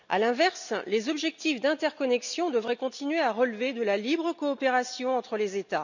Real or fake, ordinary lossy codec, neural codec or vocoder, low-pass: real; none; none; 7.2 kHz